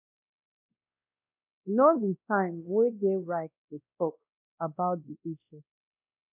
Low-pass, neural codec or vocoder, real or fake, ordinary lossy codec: 3.6 kHz; codec, 16 kHz, 1 kbps, X-Codec, WavLM features, trained on Multilingual LibriSpeech; fake; none